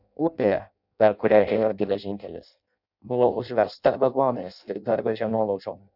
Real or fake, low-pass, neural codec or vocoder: fake; 5.4 kHz; codec, 16 kHz in and 24 kHz out, 0.6 kbps, FireRedTTS-2 codec